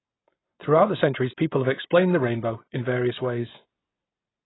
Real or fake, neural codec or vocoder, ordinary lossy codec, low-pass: real; none; AAC, 16 kbps; 7.2 kHz